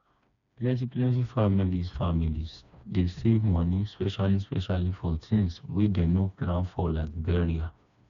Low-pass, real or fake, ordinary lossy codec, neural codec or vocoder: 7.2 kHz; fake; none; codec, 16 kHz, 2 kbps, FreqCodec, smaller model